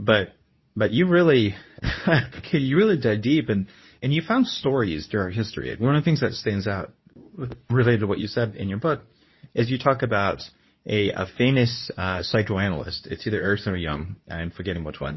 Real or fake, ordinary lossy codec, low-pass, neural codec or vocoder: fake; MP3, 24 kbps; 7.2 kHz; codec, 24 kHz, 0.9 kbps, WavTokenizer, medium speech release version 2